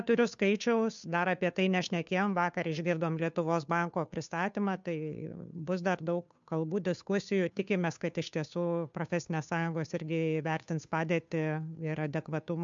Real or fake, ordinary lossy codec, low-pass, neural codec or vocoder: fake; MP3, 64 kbps; 7.2 kHz; codec, 16 kHz, 2 kbps, FunCodec, trained on Chinese and English, 25 frames a second